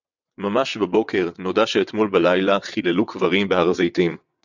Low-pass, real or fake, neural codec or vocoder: 7.2 kHz; fake; vocoder, 22.05 kHz, 80 mel bands, WaveNeXt